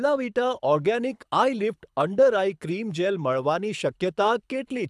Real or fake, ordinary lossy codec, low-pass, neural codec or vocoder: fake; none; none; codec, 24 kHz, 6 kbps, HILCodec